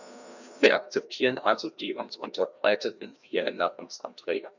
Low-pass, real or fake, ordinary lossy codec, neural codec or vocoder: 7.2 kHz; fake; none; codec, 16 kHz, 1 kbps, FreqCodec, larger model